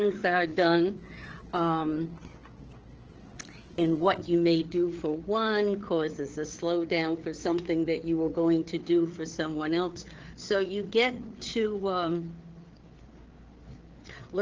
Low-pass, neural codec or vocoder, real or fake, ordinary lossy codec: 7.2 kHz; codec, 16 kHz, 4 kbps, FreqCodec, larger model; fake; Opus, 16 kbps